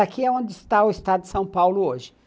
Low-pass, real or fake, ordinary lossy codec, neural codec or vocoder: none; real; none; none